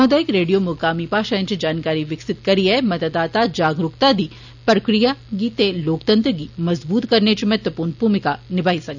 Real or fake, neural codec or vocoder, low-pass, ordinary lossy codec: real; none; 7.2 kHz; none